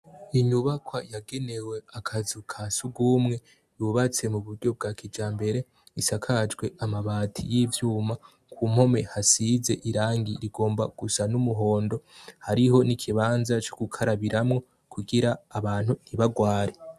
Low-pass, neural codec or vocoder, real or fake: 14.4 kHz; none; real